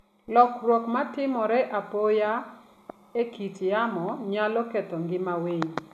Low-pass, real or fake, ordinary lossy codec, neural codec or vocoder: 14.4 kHz; real; none; none